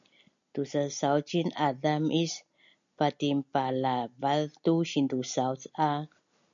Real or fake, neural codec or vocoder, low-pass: real; none; 7.2 kHz